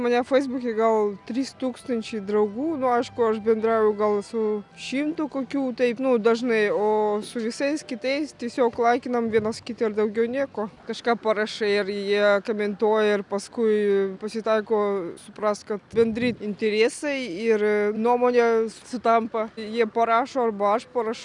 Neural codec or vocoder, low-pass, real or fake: none; 10.8 kHz; real